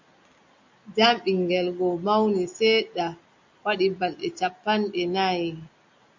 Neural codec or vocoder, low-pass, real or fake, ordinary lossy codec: none; 7.2 kHz; real; MP3, 48 kbps